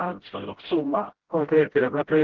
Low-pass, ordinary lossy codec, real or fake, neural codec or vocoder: 7.2 kHz; Opus, 16 kbps; fake; codec, 16 kHz, 0.5 kbps, FreqCodec, smaller model